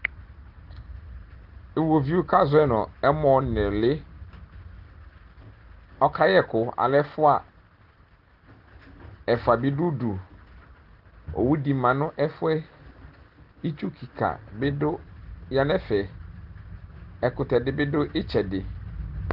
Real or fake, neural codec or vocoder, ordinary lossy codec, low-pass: real; none; Opus, 16 kbps; 5.4 kHz